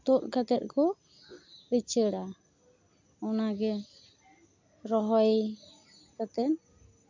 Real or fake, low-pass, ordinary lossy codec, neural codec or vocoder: real; 7.2 kHz; MP3, 64 kbps; none